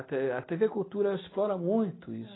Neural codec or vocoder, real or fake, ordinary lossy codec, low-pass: none; real; AAC, 16 kbps; 7.2 kHz